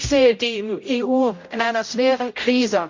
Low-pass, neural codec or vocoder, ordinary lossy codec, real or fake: 7.2 kHz; codec, 16 kHz, 0.5 kbps, X-Codec, HuBERT features, trained on general audio; MP3, 48 kbps; fake